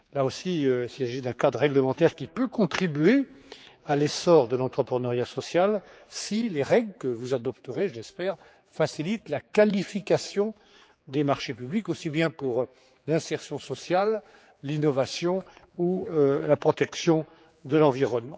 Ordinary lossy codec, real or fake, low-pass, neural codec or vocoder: none; fake; none; codec, 16 kHz, 4 kbps, X-Codec, HuBERT features, trained on general audio